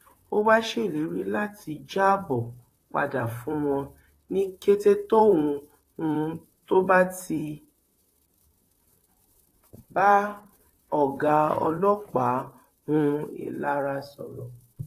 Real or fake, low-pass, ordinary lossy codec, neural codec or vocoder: fake; 14.4 kHz; AAC, 48 kbps; vocoder, 44.1 kHz, 128 mel bands, Pupu-Vocoder